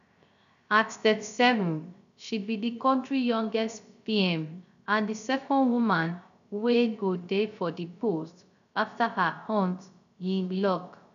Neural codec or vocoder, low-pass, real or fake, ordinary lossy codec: codec, 16 kHz, 0.3 kbps, FocalCodec; 7.2 kHz; fake; none